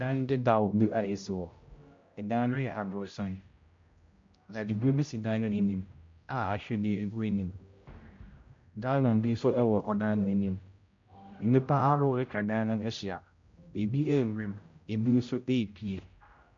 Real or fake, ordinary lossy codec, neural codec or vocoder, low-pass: fake; MP3, 48 kbps; codec, 16 kHz, 0.5 kbps, X-Codec, HuBERT features, trained on general audio; 7.2 kHz